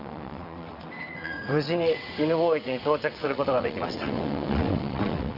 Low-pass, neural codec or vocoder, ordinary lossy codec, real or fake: 5.4 kHz; vocoder, 22.05 kHz, 80 mel bands, WaveNeXt; none; fake